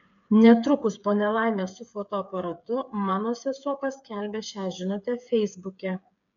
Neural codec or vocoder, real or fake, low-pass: codec, 16 kHz, 8 kbps, FreqCodec, smaller model; fake; 7.2 kHz